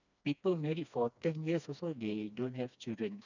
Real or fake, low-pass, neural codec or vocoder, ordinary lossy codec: fake; 7.2 kHz; codec, 16 kHz, 2 kbps, FreqCodec, smaller model; none